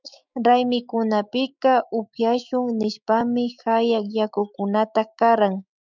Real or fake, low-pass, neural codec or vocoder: fake; 7.2 kHz; autoencoder, 48 kHz, 128 numbers a frame, DAC-VAE, trained on Japanese speech